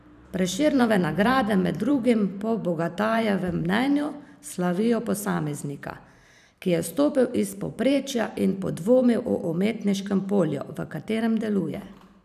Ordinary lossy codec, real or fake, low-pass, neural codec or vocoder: none; fake; 14.4 kHz; vocoder, 44.1 kHz, 128 mel bands every 512 samples, BigVGAN v2